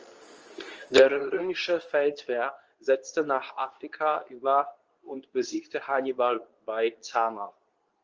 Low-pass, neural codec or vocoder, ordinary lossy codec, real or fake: 7.2 kHz; codec, 24 kHz, 0.9 kbps, WavTokenizer, medium speech release version 2; Opus, 24 kbps; fake